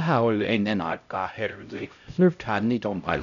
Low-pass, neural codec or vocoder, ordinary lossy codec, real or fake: 7.2 kHz; codec, 16 kHz, 0.5 kbps, X-Codec, HuBERT features, trained on LibriSpeech; none; fake